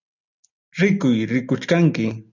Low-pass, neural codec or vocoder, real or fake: 7.2 kHz; none; real